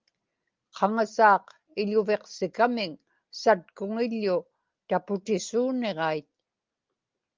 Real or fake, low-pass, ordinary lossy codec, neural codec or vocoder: real; 7.2 kHz; Opus, 32 kbps; none